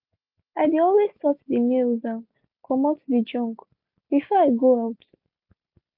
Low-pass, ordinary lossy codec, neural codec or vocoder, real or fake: 5.4 kHz; MP3, 48 kbps; none; real